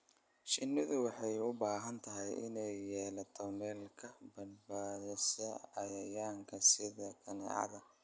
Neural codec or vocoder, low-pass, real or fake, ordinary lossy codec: none; none; real; none